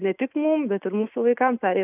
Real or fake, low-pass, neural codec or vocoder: real; 3.6 kHz; none